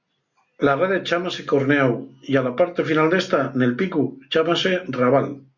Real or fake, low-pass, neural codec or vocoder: real; 7.2 kHz; none